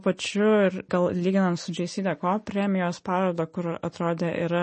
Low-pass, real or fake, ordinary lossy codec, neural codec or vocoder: 10.8 kHz; real; MP3, 32 kbps; none